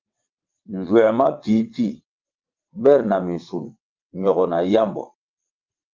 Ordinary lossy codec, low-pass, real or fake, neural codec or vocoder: Opus, 24 kbps; 7.2 kHz; fake; vocoder, 22.05 kHz, 80 mel bands, WaveNeXt